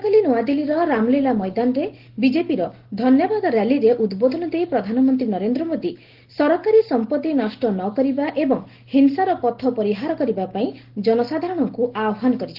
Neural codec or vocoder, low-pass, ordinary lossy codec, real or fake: none; 5.4 kHz; Opus, 16 kbps; real